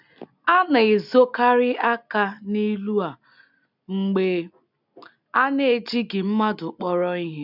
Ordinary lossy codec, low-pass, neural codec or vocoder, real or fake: none; 5.4 kHz; none; real